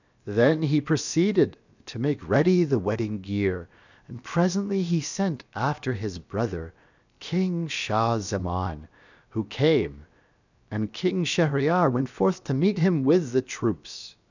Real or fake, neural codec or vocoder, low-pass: fake; codec, 16 kHz, 0.7 kbps, FocalCodec; 7.2 kHz